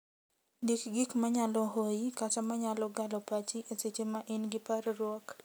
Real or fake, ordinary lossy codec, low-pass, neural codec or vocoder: real; none; none; none